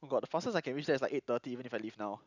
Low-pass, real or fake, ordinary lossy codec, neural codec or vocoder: 7.2 kHz; real; none; none